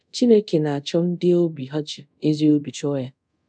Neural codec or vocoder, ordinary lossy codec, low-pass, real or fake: codec, 24 kHz, 0.5 kbps, DualCodec; none; 9.9 kHz; fake